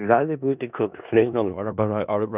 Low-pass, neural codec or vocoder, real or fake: 3.6 kHz; codec, 16 kHz in and 24 kHz out, 0.4 kbps, LongCat-Audio-Codec, four codebook decoder; fake